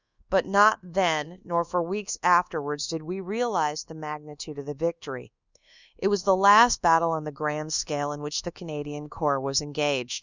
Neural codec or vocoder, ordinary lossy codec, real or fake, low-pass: codec, 24 kHz, 1.2 kbps, DualCodec; Opus, 64 kbps; fake; 7.2 kHz